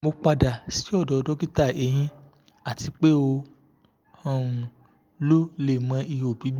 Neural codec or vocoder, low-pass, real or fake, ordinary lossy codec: none; 19.8 kHz; real; Opus, 32 kbps